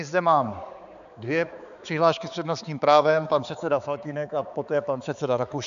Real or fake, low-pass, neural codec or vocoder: fake; 7.2 kHz; codec, 16 kHz, 4 kbps, X-Codec, HuBERT features, trained on balanced general audio